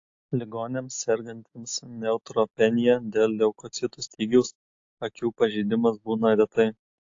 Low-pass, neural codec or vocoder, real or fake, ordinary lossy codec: 7.2 kHz; none; real; AAC, 48 kbps